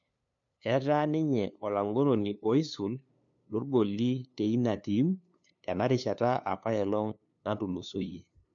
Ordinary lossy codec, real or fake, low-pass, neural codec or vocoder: MP3, 48 kbps; fake; 7.2 kHz; codec, 16 kHz, 2 kbps, FunCodec, trained on LibriTTS, 25 frames a second